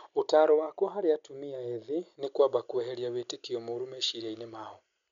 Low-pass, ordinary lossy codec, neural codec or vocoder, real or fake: 7.2 kHz; none; none; real